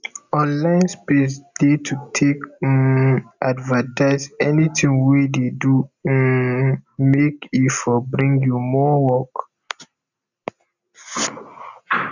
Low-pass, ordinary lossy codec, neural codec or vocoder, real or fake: 7.2 kHz; none; none; real